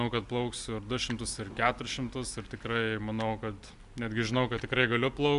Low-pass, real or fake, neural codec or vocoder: 10.8 kHz; real; none